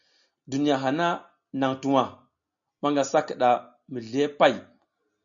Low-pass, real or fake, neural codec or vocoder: 7.2 kHz; real; none